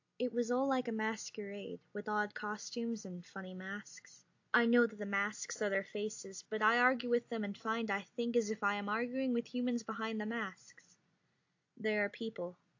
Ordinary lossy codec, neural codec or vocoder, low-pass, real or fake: AAC, 48 kbps; none; 7.2 kHz; real